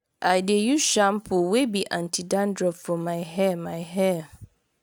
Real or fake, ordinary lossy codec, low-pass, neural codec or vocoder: real; none; none; none